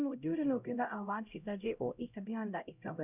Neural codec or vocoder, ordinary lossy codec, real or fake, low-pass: codec, 16 kHz, 0.5 kbps, X-Codec, HuBERT features, trained on LibriSpeech; none; fake; 3.6 kHz